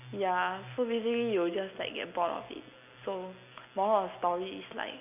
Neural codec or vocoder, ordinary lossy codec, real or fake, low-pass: none; none; real; 3.6 kHz